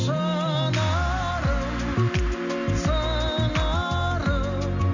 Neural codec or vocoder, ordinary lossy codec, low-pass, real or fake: none; none; 7.2 kHz; real